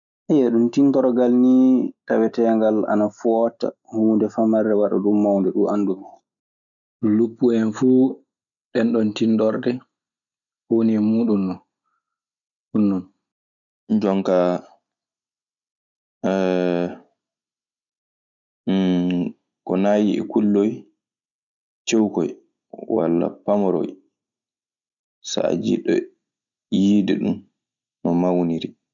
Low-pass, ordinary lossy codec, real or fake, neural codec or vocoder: 7.2 kHz; none; real; none